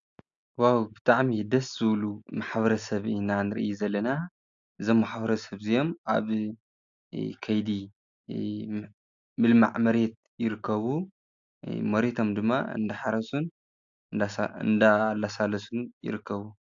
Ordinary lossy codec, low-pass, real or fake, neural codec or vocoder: AAC, 64 kbps; 7.2 kHz; real; none